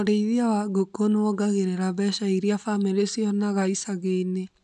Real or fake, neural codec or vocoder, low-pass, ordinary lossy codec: real; none; 10.8 kHz; none